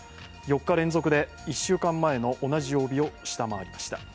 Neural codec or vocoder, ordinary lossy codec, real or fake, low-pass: none; none; real; none